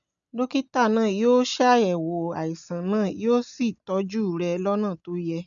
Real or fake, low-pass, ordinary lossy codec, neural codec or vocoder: real; 7.2 kHz; none; none